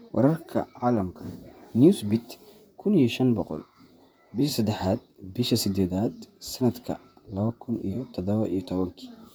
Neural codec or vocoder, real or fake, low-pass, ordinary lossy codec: vocoder, 44.1 kHz, 128 mel bands, Pupu-Vocoder; fake; none; none